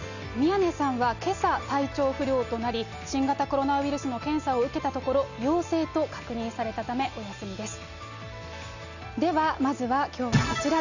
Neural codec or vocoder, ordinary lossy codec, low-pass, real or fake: none; none; 7.2 kHz; real